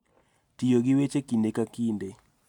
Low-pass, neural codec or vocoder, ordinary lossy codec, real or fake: 19.8 kHz; none; none; real